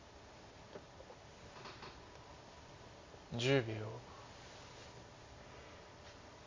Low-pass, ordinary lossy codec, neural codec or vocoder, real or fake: 7.2 kHz; MP3, 48 kbps; none; real